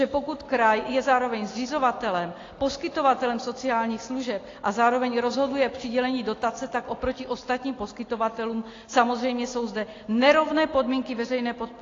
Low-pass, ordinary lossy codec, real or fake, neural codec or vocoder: 7.2 kHz; AAC, 32 kbps; real; none